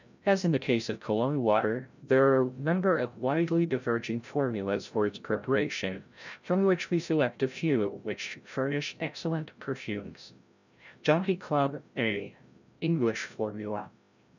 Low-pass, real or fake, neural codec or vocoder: 7.2 kHz; fake; codec, 16 kHz, 0.5 kbps, FreqCodec, larger model